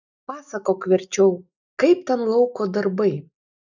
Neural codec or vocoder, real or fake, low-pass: none; real; 7.2 kHz